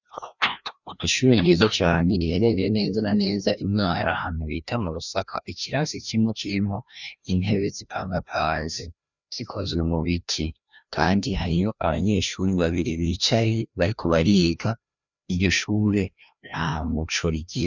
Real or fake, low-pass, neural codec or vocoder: fake; 7.2 kHz; codec, 16 kHz, 1 kbps, FreqCodec, larger model